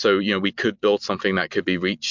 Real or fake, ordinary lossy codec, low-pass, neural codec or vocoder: real; MP3, 64 kbps; 7.2 kHz; none